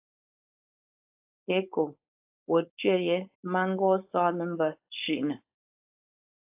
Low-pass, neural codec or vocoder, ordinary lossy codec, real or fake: 3.6 kHz; codec, 16 kHz, 4.8 kbps, FACodec; AAC, 32 kbps; fake